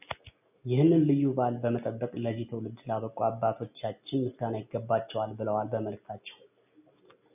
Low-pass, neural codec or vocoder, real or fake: 3.6 kHz; none; real